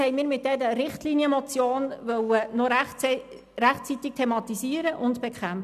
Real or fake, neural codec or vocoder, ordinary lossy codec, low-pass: real; none; none; 14.4 kHz